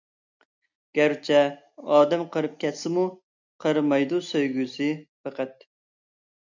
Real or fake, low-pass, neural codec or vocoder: real; 7.2 kHz; none